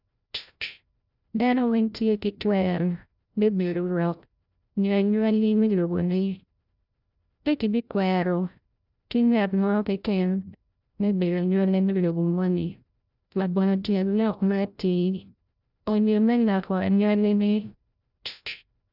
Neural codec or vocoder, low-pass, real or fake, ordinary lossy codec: codec, 16 kHz, 0.5 kbps, FreqCodec, larger model; 5.4 kHz; fake; none